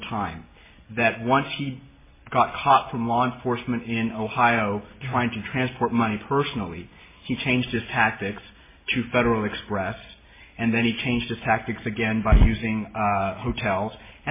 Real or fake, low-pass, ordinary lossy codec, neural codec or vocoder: real; 3.6 kHz; MP3, 16 kbps; none